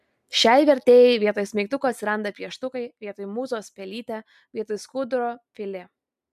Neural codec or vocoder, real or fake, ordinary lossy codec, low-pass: none; real; MP3, 96 kbps; 14.4 kHz